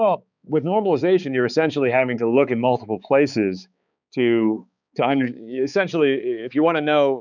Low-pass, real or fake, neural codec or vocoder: 7.2 kHz; fake; codec, 16 kHz, 4 kbps, X-Codec, HuBERT features, trained on balanced general audio